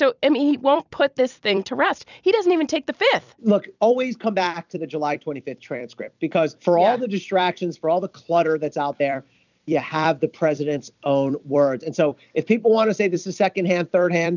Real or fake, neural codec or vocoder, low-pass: fake; vocoder, 44.1 kHz, 128 mel bands every 256 samples, BigVGAN v2; 7.2 kHz